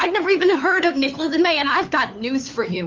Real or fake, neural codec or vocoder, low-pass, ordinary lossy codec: fake; codec, 16 kHz, 4 kbps, FunCodec, trained on LibriTTS, 50 frames a second; 7.2 kHz; Opus, 32 kbps